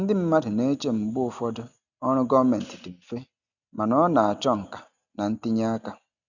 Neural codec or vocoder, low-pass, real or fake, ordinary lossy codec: none; 7.2 kHz; real; none